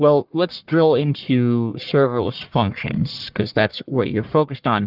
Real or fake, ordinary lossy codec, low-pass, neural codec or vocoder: fake; Opus, 32 kbps; 5.4 kHz; codec, 44.1 kHz, 1.7 kbps, Pupu-Codec